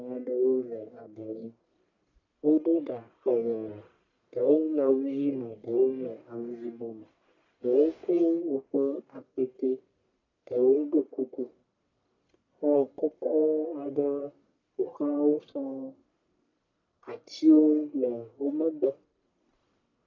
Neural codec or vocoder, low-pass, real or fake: codec, 44.1 kHz, 1.7 kbps, Pupu-Codec; 7.2 kHz; fake